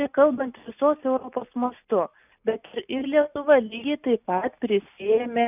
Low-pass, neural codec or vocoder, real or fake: 3.6 kHz; none; real